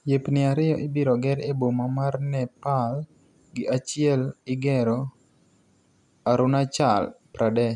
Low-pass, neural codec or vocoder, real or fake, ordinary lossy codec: none; none; real; none